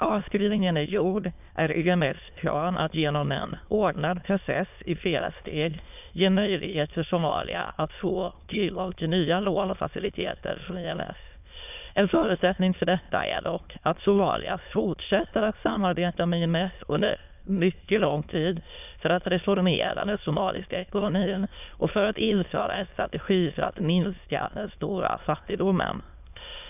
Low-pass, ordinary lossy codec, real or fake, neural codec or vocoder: 3.6 kHz; none; fake; autoencoder, 22.05 kHz, a latent of 192 numbers a frame, VITS, trained on many speakers